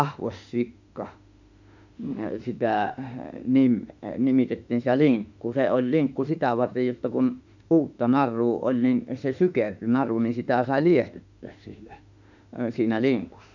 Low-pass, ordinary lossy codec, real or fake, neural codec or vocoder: 7.2 kHz; none; fake; autoencoder, 48 kHz, 32 numbers a frame, DAC-VAE, trained on Japanese speech